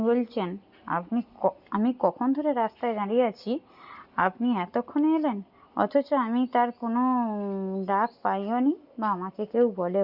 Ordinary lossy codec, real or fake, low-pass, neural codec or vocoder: Opus, 64 kbps; real; 5.4 kHz; none